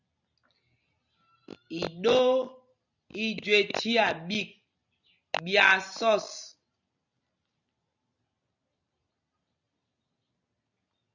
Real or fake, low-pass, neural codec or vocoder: real; 7.2 kHz; none